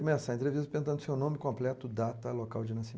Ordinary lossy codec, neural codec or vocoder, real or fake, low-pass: none; none; real; none